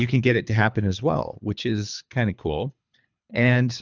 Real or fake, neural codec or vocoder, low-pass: fake; codec, 24 kHz, 3 kbps, HILCodec; 7.2 kHz